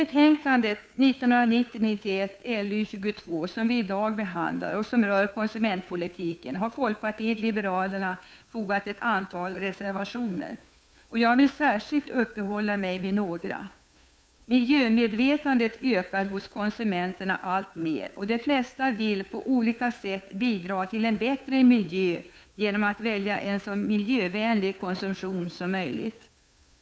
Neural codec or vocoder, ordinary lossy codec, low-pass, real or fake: codec, 16 kHz, 2 kbps, FunCodec, trained on Chinese and English, 25 frames a second; none; none; fake